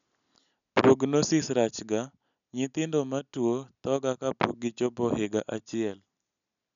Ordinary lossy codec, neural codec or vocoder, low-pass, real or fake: none; none; 7.2 kHz; real